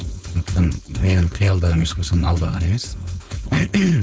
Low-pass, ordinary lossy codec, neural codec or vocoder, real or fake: none; none; codec, 16 kHz, 4.8 kbps, FACodec; fake